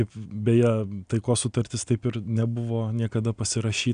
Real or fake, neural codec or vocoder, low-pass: real; none; 9.9 kHz